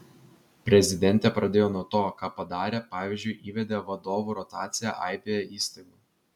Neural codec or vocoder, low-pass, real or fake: none; 19.8 kHz; real